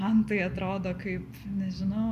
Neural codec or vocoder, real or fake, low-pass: none; real; 14.4 kHz